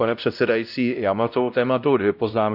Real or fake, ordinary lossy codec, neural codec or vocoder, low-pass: fake; none; codec, 16 kHz, 0.5 kbps, X-Codec, WavLM features, trained on Multilingual LibriSpeech; 5.4 kHz